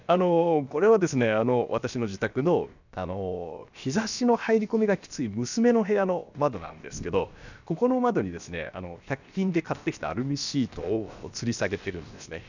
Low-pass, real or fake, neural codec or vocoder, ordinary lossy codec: 7.2 kHz; fake; codec, 16 kHz, about 1 kbps, DyCAST, with the encoder's durations; none